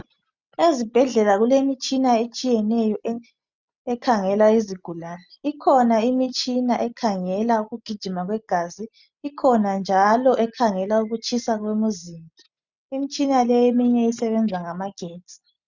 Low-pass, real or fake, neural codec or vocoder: 7.2 kHz; real; none